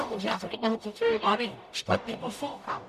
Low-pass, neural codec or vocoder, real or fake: 14.4 kHz; codec, 44.1 kHz, 0.9 kbps, DAC; fake